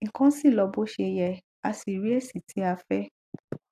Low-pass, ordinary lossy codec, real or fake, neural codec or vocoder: 14.4 kHz; AAC, 96 kbps; real; none